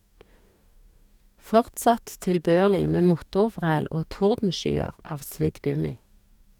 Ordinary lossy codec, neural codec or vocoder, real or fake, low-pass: none; codec, 44.1 kHz, 2.6 kbps, DAC; fake; 19.8 kHz